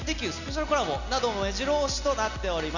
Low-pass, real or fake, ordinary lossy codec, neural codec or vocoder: 7.2 kHz; real; none; none